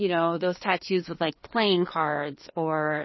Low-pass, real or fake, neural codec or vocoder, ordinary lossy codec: 7.2 kHz; fake; codec, 16 kHz, 2 kbps, FreqCodec, larger model; MP3, 24 kbps